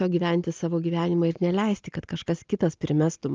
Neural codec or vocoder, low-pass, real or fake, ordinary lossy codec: none; 7.2 kHz; real; Opus, 24 kbps